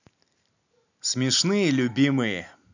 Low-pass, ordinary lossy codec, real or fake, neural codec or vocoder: 7.2 kHz; none; real; none